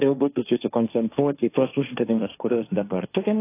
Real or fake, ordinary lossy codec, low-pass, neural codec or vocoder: fake; AAC, 24 kbps; 3.6 kHz; codec, 16 kHz, 1.1 kbps, Voila-Tokenizer